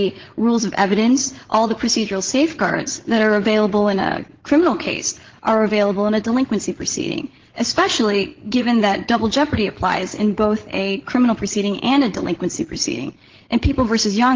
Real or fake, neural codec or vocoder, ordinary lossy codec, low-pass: fake; codec, 16 kHz, 16 kbps, FreqCodec, larger model; Opus, 16 kbps; 7.2 kHz